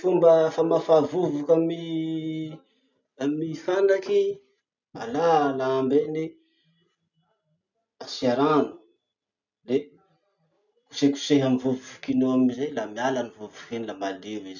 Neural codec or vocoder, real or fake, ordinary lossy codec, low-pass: none; real; none; 7.2 kHz